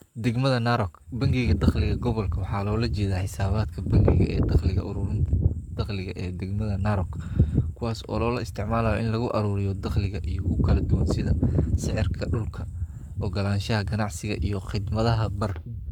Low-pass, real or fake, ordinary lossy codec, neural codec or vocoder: 19.8 kHz; fake; none; codec, 44.1 kHz, 7.8 kbps, Pupu-Codec